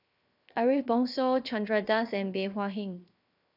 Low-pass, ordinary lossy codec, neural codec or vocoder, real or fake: 5.4 kHz; none; codec, 16 kHz, 0.7 kbps, FocalCodec; fake